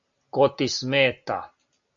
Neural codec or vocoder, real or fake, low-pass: none; real; 7.2 kHz